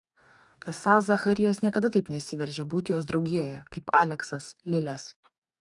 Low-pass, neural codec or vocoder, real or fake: 10.8 kHz; codec, 44.1 kHz, 2.6 kbps, DAC; fake